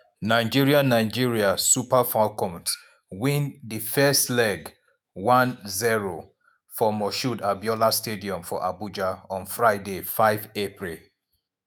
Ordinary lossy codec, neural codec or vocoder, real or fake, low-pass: none; autoencoder, 48 kHz, 128 numbers a frame, DAC-VAE, trained on Japanese speech; fake; none